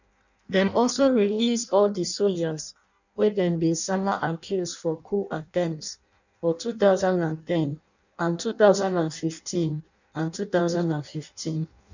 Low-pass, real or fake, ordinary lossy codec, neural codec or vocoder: 7.2 kHz; fake; none; codec, 16 kHz in and 24 kHz out, 0.6 kbps, FireRedTTS-2 codec